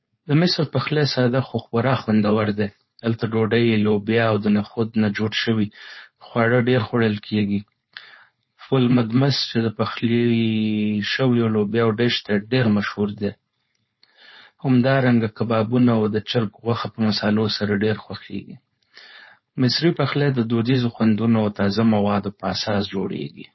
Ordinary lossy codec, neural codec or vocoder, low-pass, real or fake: MP3, 24 kbps; codec, 16 kHz, 4.8 kbps, FACodec; 7.2 kHz; fake